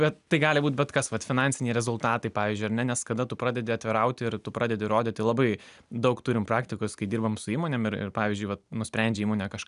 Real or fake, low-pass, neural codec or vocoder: real; 10.8 kHz; none